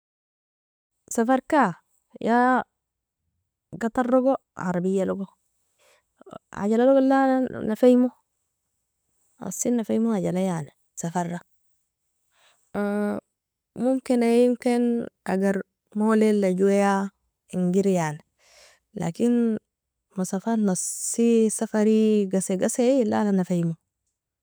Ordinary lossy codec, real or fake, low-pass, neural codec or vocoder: none; real; none; none